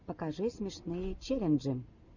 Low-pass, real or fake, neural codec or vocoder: 7.2 kHz; real; none